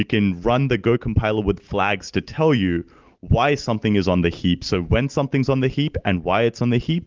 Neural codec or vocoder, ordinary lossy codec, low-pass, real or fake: none; Opus, 32 kbps; 7.2 kHz; real